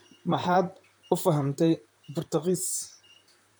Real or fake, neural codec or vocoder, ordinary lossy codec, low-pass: fake; vocoder, 44.1 kHz, 128 mel bands, Pupu-Vocoder; none; none